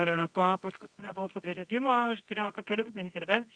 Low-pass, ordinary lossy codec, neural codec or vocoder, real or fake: 9.9 kHz; Opus, 64 kbps; codec, 24 kHz, 0.9 kbps, WavTokenizer, medium music audio release; fake